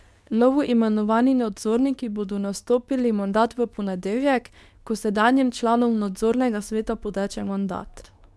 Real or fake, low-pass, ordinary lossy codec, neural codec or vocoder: fake; none; none; codec, 24 kHz, 0.9 kbps, WavTokenizer, medium speech release version 2